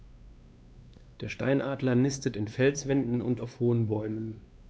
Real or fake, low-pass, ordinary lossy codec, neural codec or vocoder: fake; none; none; codec, 16 kHz, 2 kbps, X-Codec, WavLM features, trained on Multilingual LibriSpeech